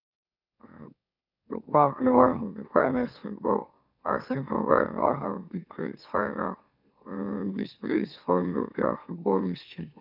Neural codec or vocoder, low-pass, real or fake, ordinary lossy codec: autoencoder, 44.1 kHz, a latent of 192 numbers a frame, MeloTTS; 5.4 kHz; fake; none